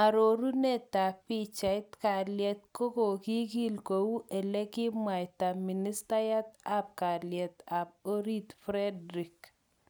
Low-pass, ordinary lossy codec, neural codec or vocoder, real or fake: none; none; none; real